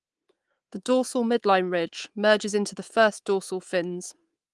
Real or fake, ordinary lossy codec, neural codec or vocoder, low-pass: fake; Opus, 24 kbps; codec, 24 kHz, 3.1 kbps, DualCodec; 10.8 kHz